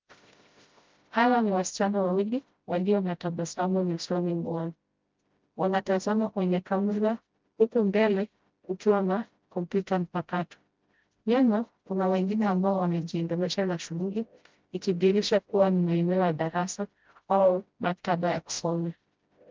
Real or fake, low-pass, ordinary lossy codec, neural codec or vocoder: fake; 7.2 kHz; Opus, 32 kbps; codec, 16 kHz, 0.5 kbps, FreqCodec, smaller model